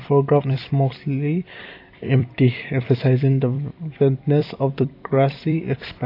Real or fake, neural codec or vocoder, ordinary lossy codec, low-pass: fake; vocoder, 44.1 kHz, 128 mel bands every 256 samples, BigVGAN v2; AAC, 32 kbps; 5.4 kHz